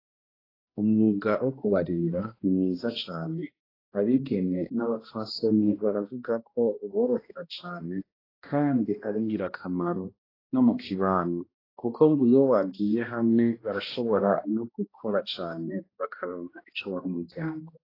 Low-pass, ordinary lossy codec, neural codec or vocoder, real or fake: 5.4 kHz; AAC, 24 kbps; codec, 16 kHz, 1 kbps, X-Codec, HuBERT features, trained on balanced general audio; fake